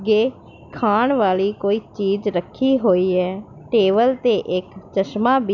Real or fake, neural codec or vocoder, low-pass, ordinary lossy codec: real; none; 7.2 kHz; none